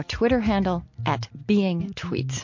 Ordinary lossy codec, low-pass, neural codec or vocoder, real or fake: MP3, 48 kbps; 7.2 kHz; none; real